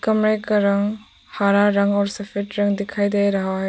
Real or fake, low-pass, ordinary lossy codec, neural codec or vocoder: real; none; none; none